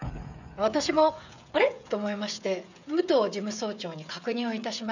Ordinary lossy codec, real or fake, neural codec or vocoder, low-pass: none; fake; codec, 16 kHz, 16 kbps, FreqCodec, smaller model; 7.2 kHz